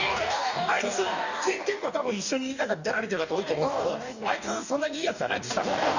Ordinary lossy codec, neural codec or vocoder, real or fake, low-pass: none; codec, 44.1 kHz, 2.6 kbps, DAC; fake; 7.2 kHz